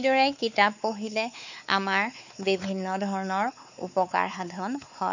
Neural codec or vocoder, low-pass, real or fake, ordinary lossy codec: codec, 16 kHz, 4 kbps, X-Codec, WavLM features, trained on Multilingual LibriSpeech; 7.2 kHz; fake; none